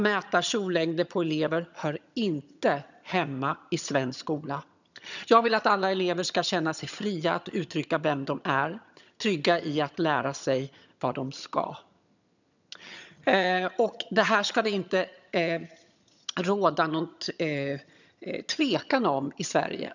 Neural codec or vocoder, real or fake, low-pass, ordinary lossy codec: vocoder, 22.05 kHz, 80 mel bands, HiFi-GAN; fake; 7.2 kHz; none